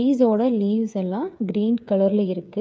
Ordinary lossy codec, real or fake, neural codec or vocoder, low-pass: none; fake; codec, 16 kHz, 8 kbps, FreqCodec, smaller model; none